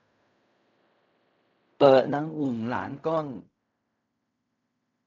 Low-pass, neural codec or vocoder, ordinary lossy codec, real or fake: 7.2 kHz; codec, 16 kHz in and 24 kHz out, 0.4 kbps, LongCat-Audio-Codec, fine tuned four codebook decoder; none; fake